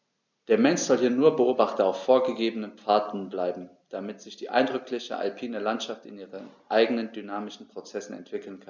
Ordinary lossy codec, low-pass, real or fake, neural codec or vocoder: none; 7.2 kHz; real; none